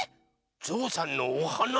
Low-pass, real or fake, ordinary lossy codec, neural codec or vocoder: none; real; none; none